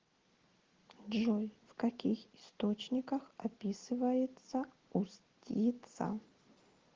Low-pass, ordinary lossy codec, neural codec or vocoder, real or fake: 7.2 kHz; Opus, 16 kbps; none; real